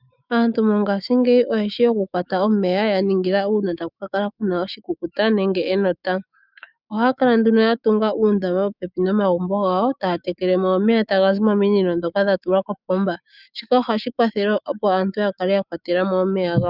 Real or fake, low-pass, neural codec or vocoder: fake; 5.4 kHz; autoencoder, 48 kHz, 128 numbers a frame, DAC-VAE, trained on Japanese speech